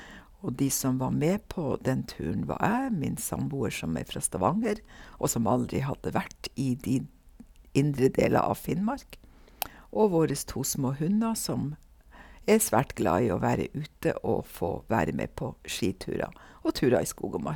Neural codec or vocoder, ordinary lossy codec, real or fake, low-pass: none; none; real; none